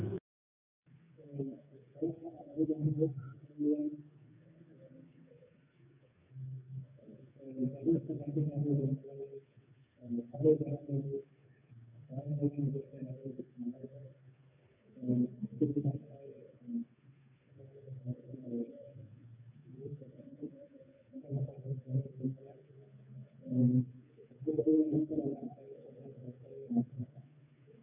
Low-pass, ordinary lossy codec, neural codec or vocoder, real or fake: 3.6 kHz; none; codec, 16 kHz, 4 kbps, FreqCodec, smaller model; fake